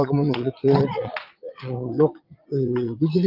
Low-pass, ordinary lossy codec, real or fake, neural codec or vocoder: 5.4 kHz; Opus, 32 kbps; fake; vocoder, 22.05 kHz, 80 mel bands, Vocos